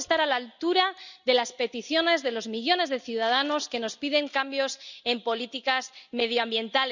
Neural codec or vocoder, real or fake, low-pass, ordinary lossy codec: none; real; 7.2 kHz; none